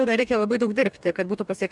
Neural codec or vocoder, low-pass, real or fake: codec, 44.1 kHz, 2.6 kbps, DAC; 10.8 kHz; fake